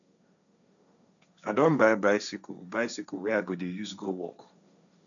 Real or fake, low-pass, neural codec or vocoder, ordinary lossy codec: fake; 7.2 kHz; codec, 16 kHz, 1.1 kbps, Voila-Tokenizer; none